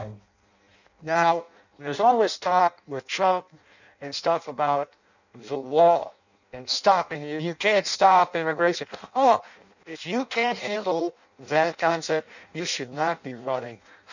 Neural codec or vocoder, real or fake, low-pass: codec, 16 kHz in and 24 kHz out, 0.6 kbps, FireRedTTS-2 codec; fake; 7.2 kHz